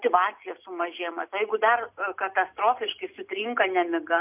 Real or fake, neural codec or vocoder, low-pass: real; none; 3.6 kHz